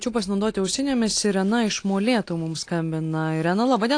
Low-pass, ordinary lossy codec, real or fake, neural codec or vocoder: 9.9 kHz; AAC, 48 kbps; real; none